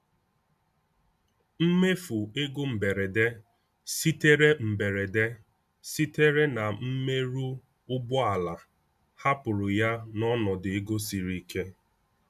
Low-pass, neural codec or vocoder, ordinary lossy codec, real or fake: 14.4 kHz; none; MP3, 96 kbps; real